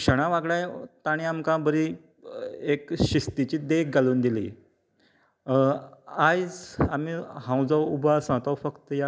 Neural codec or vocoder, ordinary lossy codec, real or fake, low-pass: none; none; real; none